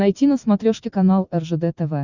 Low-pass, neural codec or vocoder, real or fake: 7.2 kHz; none; real